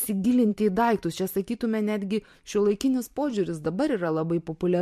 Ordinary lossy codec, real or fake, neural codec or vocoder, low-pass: MP3, 64 kbps; fake; vocoder, 44.1 kHz, 128 mel bands every 512 samples, BigVGAN v2; 14.4 kHz